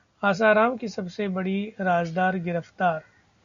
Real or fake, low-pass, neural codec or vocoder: real; 7.2 kHz; none